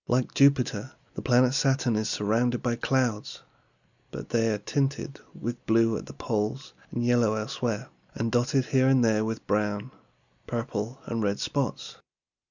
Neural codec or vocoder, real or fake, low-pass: none; real; 7.2 kHz